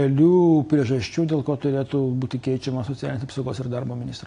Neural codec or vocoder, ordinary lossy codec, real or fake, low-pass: none; AAC, 48 kbps; real; 9.9 kHz